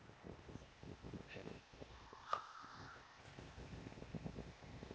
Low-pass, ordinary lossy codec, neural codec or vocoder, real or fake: none; none; codec, 16 kHz, 0.8 kbps, ZipCodec; fake